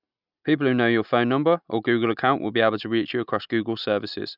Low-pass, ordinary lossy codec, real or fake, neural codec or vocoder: 5.4 kHz; none; real; none